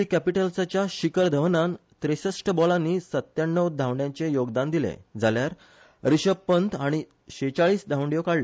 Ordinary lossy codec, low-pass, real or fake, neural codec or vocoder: none; none; real; none